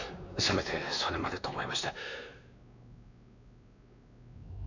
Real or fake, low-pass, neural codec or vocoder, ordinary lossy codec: fake; 7.2 kHz; codec, 16 kHz, 2 kbps, X-Codec, WavLM features, trained on Multilingual LibriSpeech; none